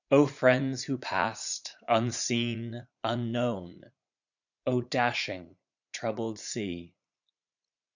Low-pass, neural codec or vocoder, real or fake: 7.2 kHz; vocoder, 44.1 kHz, 80 mel bands, Vocos; fake